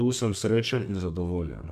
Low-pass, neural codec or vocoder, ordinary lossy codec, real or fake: 14.4 kHz; codec, 32 kHz, 1.9 kbps, SNAC; MP3, 96 kbps; fake